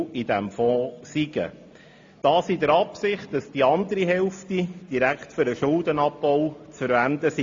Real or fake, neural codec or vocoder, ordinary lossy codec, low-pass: real; none; AAC, 48 kbps; 7.2 kHz